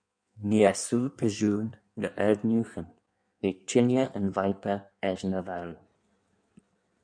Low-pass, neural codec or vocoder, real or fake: 9.9 kHz; codec, 16 kHz in and 24 kHz out, 1.1 kbps, FireRedTTS-2 codec; fake